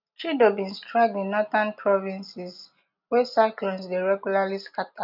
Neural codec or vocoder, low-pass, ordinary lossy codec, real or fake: none; 5.4 kHz; none; real